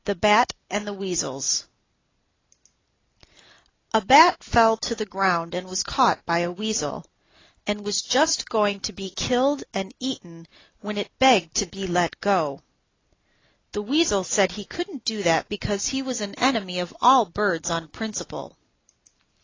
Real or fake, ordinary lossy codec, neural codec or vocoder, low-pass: real; AAC, 32 kbps; none; 7.2 kHz